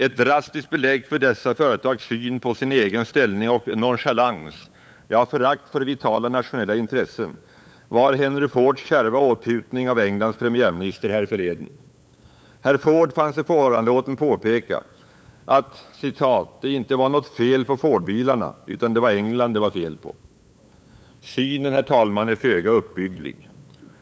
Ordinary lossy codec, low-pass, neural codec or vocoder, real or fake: none; none; codec, 16 kHz, 8 kbps, FunCodec, trained on LibriTTS, 25 frames a second; fake